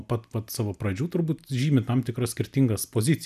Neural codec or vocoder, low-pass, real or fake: none; 14.4 kHz; real